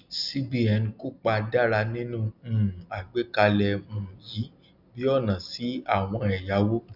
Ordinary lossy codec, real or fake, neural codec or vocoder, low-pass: none; real; none; 5.4 kHz